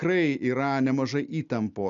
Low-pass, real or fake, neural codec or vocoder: 7.2 kHz; real; none